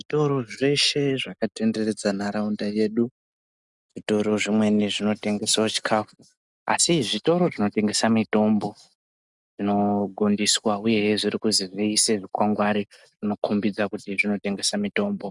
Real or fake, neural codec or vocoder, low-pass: real; none; 10.8 kHz